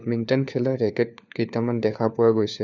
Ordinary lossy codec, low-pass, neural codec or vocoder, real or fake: none; 7.2 kHz; codec, 16 kHz, 4 kbps, FunCodec, trained on LibriTTS, 50 frames a second; fake